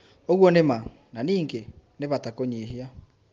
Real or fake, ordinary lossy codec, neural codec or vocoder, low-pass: real; Opus, 32 kbps; none; 7.2 kHz